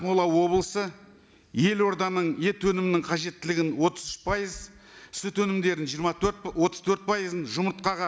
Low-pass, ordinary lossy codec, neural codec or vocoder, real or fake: none; none; none; real